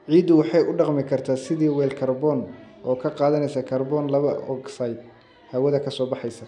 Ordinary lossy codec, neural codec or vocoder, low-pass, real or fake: none; none; 10.8 kHz; real